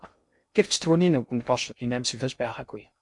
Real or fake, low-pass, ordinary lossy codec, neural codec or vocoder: fake; 10.8 kHz; MP3, 64 kbps; codec, 16 kHz in and 24 kHz out, 0.6 kbps, FocalCodec, streaming, 2048 codes